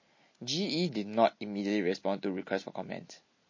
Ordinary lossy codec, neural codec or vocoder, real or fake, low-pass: MP3, 32 kbps; none; real; 7.2 kHz